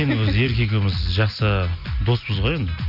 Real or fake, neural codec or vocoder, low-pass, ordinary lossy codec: real; none; 5.4 kHz; none